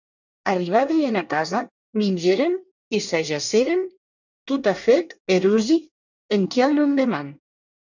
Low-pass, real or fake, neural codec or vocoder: 7.2 kHz; fake; codec, 24 kHz, 1 kbps, SNAC